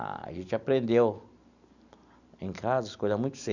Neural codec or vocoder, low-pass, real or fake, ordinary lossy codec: none; 7.2 kHz; real; none